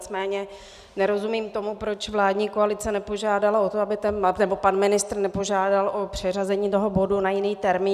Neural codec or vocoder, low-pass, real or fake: none; 14.4 kHz; real